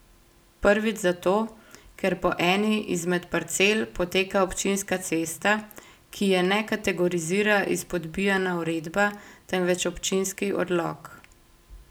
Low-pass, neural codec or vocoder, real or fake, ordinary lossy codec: none; none; real; none